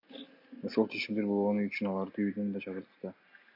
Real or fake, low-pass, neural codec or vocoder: real; 5.4 kHz; none